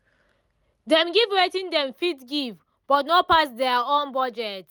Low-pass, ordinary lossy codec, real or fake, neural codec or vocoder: none; none; real; none